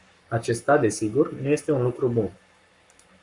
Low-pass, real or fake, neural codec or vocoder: 10.8 kHz; fake; codec, 44.1 kHz, 7.8 kbps, Pupu-Codec